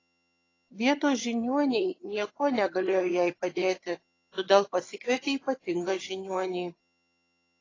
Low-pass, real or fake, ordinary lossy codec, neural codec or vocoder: 7.2 kHz; fake; AAC, 32 kbps; vocoder, 22.05 kHz, 80 mel bands, HiFi-GAN